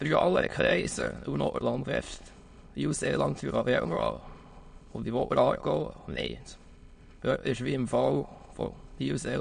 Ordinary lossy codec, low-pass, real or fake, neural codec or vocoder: MP3, 48 kbps; 9.9 kHz; fake; autoencoder, 22.05 kHz, a latent of 192 numbers a frame, VITS, trained on many speakers